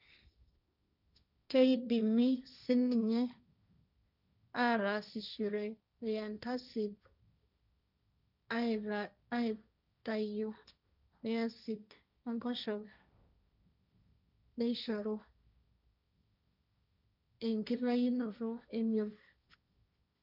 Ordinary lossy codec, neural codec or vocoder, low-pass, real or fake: none; codec, 16 kHz, 1.1 kbps, Voila-Tokenizer; 5.4 kHz; fake